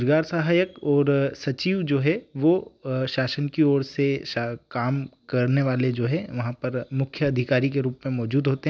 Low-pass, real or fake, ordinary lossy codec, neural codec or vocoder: none; real; none; none